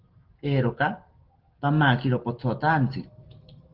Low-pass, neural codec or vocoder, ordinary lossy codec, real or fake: 5.4 kHz; none; Opus, 16 kbps; real